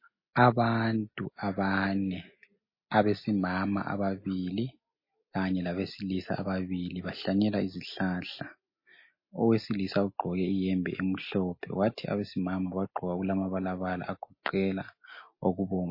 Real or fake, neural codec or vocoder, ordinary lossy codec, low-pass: real; none; MP3, 24 kbps; 5.4 kHz